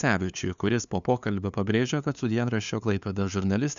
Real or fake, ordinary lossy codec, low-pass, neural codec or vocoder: fake; MP3, 96 kbps; 7.2 kHz; codec, 16 kHz, 2 kbps, FunCodec, trained on LibriTTS, 25 frames a second